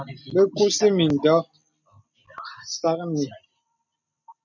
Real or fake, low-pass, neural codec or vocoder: real; 7.2 kHz; none